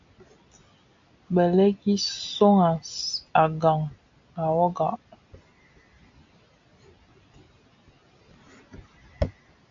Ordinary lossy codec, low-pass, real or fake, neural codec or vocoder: AAC, 48 kbps; 7.2 kHz; real; none